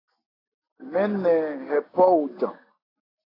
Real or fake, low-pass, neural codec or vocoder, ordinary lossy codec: real; 5.4 kHz; none; AAC, 24 kbps